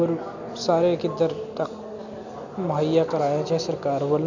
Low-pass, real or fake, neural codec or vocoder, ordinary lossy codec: 7.2 kHz; real; none; none